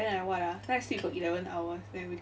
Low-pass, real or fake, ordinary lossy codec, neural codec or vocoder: none; real; none; none